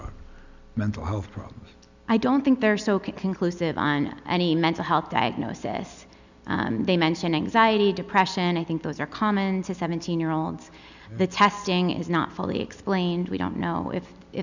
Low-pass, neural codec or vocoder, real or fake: 7.2 kHz; none; real